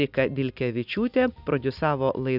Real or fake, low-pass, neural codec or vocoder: real; 5.4 kHz; none